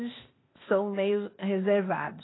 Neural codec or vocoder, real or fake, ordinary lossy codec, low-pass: codec, 16 kHz, 0.8 kbps, ZipCodec; fake; AAC, 16 kbps; 7.2 kHz